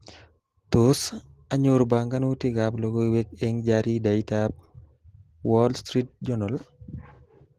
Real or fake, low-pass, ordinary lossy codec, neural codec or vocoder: real; 14.4 kHz; Opus, 16 kbps; none